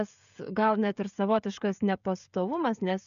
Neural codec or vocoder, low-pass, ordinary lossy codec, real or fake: codec, 16 kHz, 16 kbps, FreqCodec, smaller model; 7.2 kHz; MP3, 96 kbps; fake